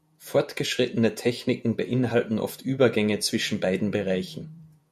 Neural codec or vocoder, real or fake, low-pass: vocoder, 48 kHz, 128 mel bands, Vocos; fake; 14.4 kHz